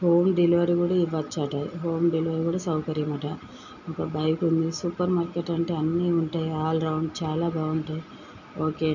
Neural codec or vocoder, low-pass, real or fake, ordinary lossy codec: none; 7.2 kHz; real; none